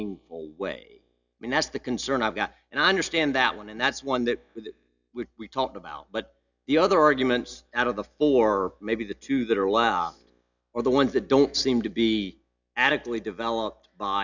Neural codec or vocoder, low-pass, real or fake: none; 7.2 kHz; real